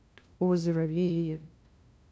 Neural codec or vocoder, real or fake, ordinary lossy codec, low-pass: codec, 16 kHz, 0.5 kbps, FunCodec, trained on LibriTTS, 25 frames a second; fake; none; none